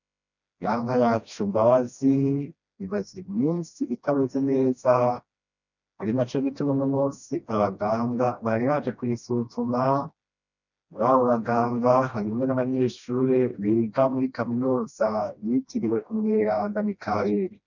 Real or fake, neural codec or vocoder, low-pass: fake; codec, 16 kHz, 1 kbps, FreqCodec, smaller model; 7.2 kHz